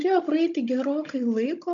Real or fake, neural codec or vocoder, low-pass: fake; codec, 16 kHz, 16 kbps, FunCodec, trained on Chinese and English, 50 frames a second; 7.2 kHz